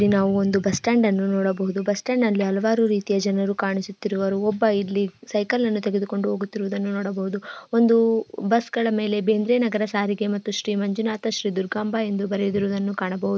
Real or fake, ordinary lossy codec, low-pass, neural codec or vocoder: real; none; none; none